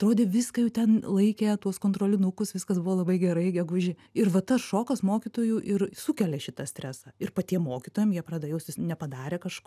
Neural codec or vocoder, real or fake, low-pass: none; real; 14.4 kHz